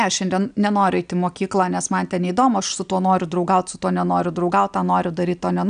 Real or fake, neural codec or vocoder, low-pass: real; none; 9.9 kHz